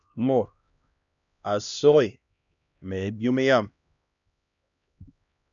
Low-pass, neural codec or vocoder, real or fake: 7.2 kHz; codec, 16 kHz, 1 kbps, X-Codec, HuBERT features, trained on LibriSpeech; fake